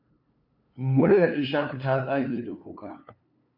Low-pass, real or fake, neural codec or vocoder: 5.4 kHz; fake; codec, 16 kHz, 2 kbps, FunCodec, trained on LibriTTS, 25 frames a second